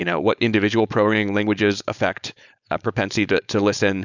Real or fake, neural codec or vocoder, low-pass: fake; codec, 16 kHz, 4.8 kbps, FACodec; 7.2 kHz